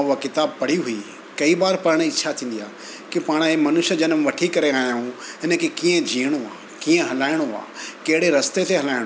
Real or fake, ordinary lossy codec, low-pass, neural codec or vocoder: real; none; none; none